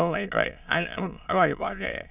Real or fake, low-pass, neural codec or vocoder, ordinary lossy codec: fake; 3.6 kHz; autoencoder, 22.05 kHz, a latent of 192 numbers a frame, VITS, trained on many speakers; none